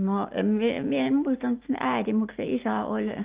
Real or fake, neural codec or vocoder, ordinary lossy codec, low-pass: real; none; Opus, 32 kbps; 3.6 kHz